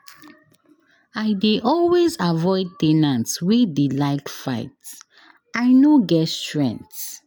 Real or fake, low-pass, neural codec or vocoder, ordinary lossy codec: real; none; none; none